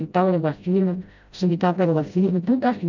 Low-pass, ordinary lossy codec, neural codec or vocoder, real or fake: 7.2 kHz; none; codec, 16 kHz, 0.5 kbps, FreqCodec, smaller model; fake